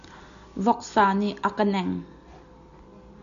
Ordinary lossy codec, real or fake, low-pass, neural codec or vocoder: MP3, 96 kbps; real; 7.2 kHz; none